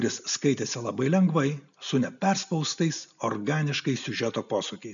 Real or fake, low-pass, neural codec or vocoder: real; 7.2 kHz; none